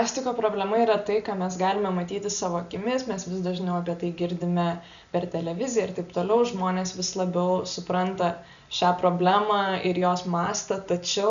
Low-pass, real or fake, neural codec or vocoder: 7.2 kHz; real; none